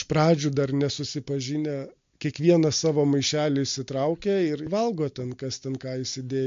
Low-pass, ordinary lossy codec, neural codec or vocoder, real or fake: 7.2 kHz; MP3, 48 kbps; none; real